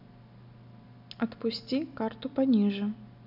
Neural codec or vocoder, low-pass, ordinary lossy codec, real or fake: none; 5.4 kHz; none; real